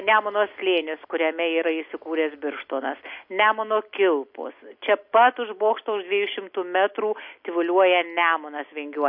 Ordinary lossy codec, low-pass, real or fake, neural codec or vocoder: MP3, 32 kbps; 5.4 kHz; real; none